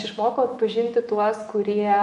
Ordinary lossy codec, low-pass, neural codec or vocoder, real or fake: MP3, 48 kbps; 14.4 kHz; autoencoder, 48 kHz, 128 numbers a frame, DAC-VAE, trained on Japanese speech; fake